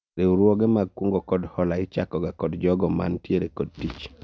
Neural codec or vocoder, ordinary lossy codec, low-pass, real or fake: none; none; none; real